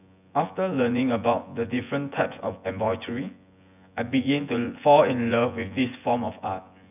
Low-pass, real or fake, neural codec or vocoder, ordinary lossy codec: 3.6 kHz; fake; vocoder, 24 kHz, 100 mel bands, Vocos; none